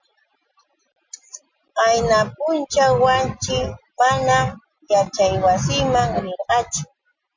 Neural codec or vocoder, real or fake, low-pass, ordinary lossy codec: none; real; 7.2 kHz; MP3, 48 kbps